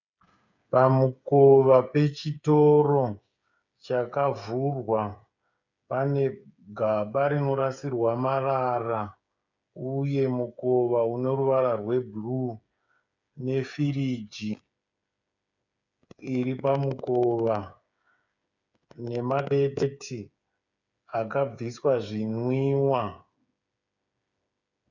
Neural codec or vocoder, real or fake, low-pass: codec, 16 kHz, 8 kbps, FreqCodec, smaller model; fake; 7.2 kHz